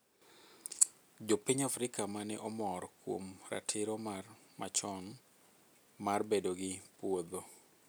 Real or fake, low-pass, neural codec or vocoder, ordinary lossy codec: real; none; none; none